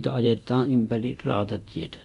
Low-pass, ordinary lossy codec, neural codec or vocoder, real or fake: 10.8 kHz; none; codec, 24 kHz, 0.9 kbps, DualCodec; fake